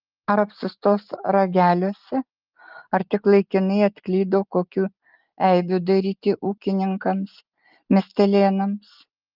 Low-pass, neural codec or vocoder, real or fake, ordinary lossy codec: 5.4 kHz; none; real; Opus, 32 kbps